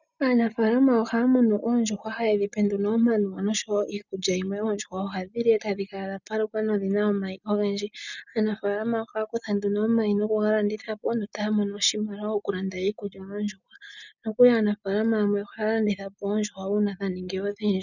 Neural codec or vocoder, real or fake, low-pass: none; real; 7.2 kHz